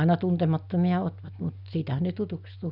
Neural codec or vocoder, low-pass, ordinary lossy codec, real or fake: none; 5.4 kHz; none; real